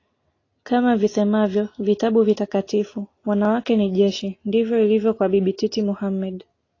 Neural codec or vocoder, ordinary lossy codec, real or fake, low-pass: none; AAC, 32 kbps; real; 7.2 kHz